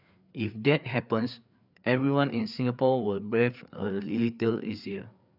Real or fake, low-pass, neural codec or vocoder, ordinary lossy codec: fake; 5.4 kHz; codec, 16 kHz, 4 kbps, FreqCodec, larger model; none